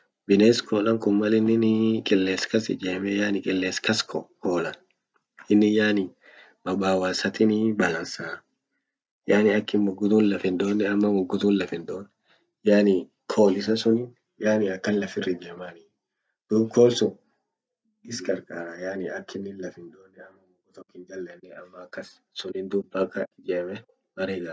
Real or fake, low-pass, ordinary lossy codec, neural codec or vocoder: real; none; none; none